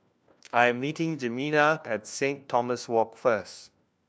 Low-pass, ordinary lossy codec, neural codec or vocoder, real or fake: none; none; codec, 16 kHz, 1 kbps, FunCodec, trained on LibriTTS, 50 frames a second; fake